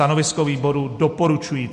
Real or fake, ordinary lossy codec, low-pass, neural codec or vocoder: real; MP3, 48 kbps; 14.4 kHz; none